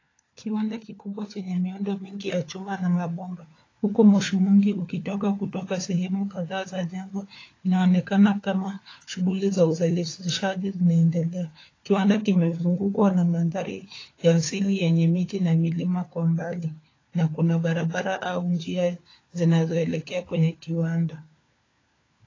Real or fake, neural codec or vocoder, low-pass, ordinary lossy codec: fake; codec, 16 kHz, 4 kbps, FunCodec, trained on LibriTTS, 50 frames a second; 7.2 kHz; AAC, 32 kbps